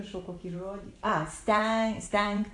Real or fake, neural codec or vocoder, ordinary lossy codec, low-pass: fake; vocoder, 44.1 kHz, 128 mel bands every 512 samples, BigVGAN v2; AAC, 64 kbps; 10.8 kHz